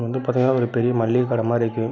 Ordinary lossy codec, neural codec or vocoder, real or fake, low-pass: none; none; real; 7.2 kHz